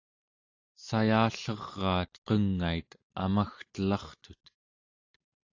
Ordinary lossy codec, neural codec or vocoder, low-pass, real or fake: AAC, 48 kbps; none; 7.2 kHz; real